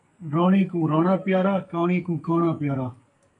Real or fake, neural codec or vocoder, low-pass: fake; codec, 44.1 kHz, 2.6 kbps, SNAC; 10.8 kHz